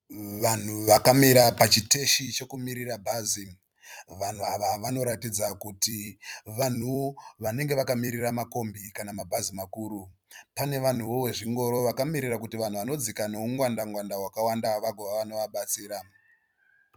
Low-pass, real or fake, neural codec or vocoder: 19.8 kHz; fake; vocoder, 44.1 kHz, 128 mel bands every 256 samples, BigVGAN v2